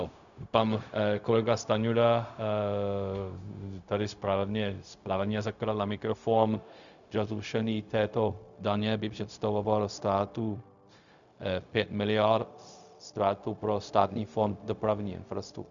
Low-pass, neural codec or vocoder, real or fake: 7.2 kHz; codec, 16 kHz, 0.4 kbps, LongCat-Audio-Codec; fake